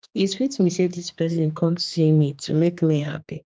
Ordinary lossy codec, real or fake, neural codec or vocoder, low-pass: none; fake; codec, 16 kHz, 2 kbps, X-Codec, HuBERT features, trained on general audio; none